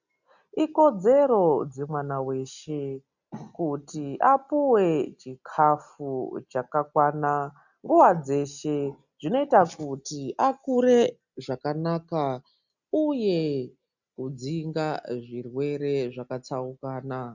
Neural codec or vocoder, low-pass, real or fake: none; 7.2 kHz; real